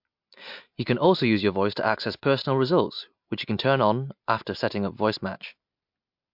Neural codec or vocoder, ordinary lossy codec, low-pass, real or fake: none; MP3, 48 kbps; 5.4 kHz; real